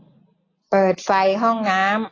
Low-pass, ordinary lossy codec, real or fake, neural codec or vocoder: 7.2 kHz; AAC, 32 kbps; real; none